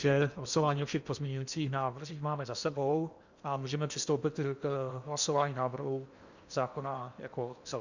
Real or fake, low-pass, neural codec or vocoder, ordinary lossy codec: fake; 7.2 kHz; codec, 16 kHz in and 24 kHz out, 0.8 kbps, FocalCodec, streaming, 65536 codes; Opus, 64 kbps